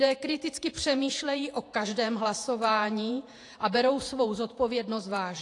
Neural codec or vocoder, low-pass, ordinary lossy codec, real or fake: vocoder, 48 kHz, 128 mel bands, Vocos; 10.8 kHz; AAC, 48 kbps; fake